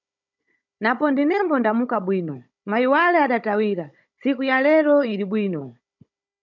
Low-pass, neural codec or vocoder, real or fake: 7.2 kHz; codec, 16 kHz, 16 kbps, FunCodec, trained on Chinese and English, 50 frames a second; fake